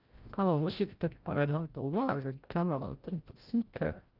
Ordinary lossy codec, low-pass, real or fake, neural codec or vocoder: Opus, 32 kbps; 5.4 kHz; fake; codec, 16 kHz, 0.5 kbps, FreqCodec, larger model